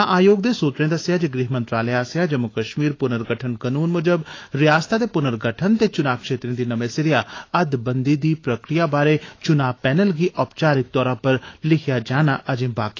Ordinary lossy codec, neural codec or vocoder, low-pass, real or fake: AAC, 32 kbps; autoencoder, 48 kHz, 128 numbers a frame, DAC-VAE, trained on Japanese speech; 7.2 kHz; fake